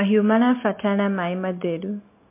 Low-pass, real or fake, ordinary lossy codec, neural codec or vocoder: 3.6 kHz; real; MP3, 24 kbps; none